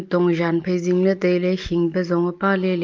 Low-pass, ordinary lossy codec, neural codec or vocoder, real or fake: 7.2 kHz; Opus, 24 kbps; none; real